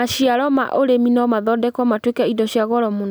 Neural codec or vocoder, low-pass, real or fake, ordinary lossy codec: none; none; real; none